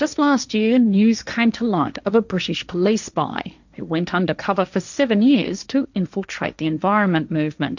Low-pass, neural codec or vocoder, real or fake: 7.2 kHz; codec, 16 kHz, 1.1 kbps, Voila-Tokenizer; fake